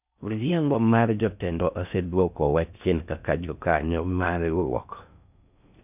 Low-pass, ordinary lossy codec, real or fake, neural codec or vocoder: 3.6 kHz; none; fake; codec, 16 kHz in and 24 kHz out, 0.6 kbps, FocalCodec, streaming, 4096 codes